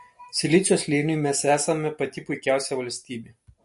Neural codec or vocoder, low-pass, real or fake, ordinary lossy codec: none; 14.4 kHz; real; MP3, 48 kbps